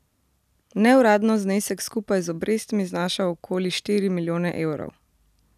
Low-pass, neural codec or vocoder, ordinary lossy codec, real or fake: 14.4 kHz; none; none; real